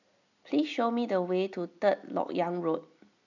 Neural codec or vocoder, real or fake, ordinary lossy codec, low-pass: none; real; none; 7.2 kHz